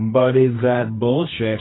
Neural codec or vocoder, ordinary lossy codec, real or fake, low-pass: codec, 24 kHz, 0.9 kbps, WavTokenizer, medium music audio release; AAC, 16 kbps; fake; 7.2 kHz